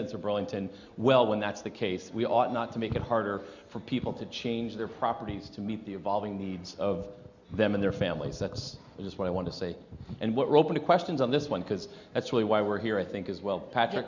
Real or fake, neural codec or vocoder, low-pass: real; none; 7.2 kHz